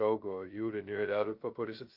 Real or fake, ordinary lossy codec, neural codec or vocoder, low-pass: fake; Opus, 24 kbps; codec, 16 kHz, 0.2 kbps, FocalCodec; 5.4 kHz